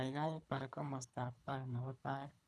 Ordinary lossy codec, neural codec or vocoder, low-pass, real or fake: none; codec, 24 kHz, 3 kbps, HILCodec; none; fake